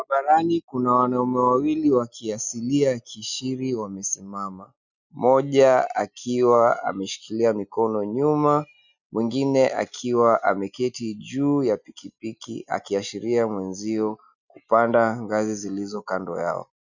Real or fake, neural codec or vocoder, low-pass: real; none; 7.2 kHz